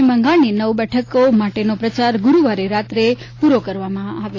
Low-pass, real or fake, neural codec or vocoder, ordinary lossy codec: 7.2 kHz; real; none; AAC, 32 kbps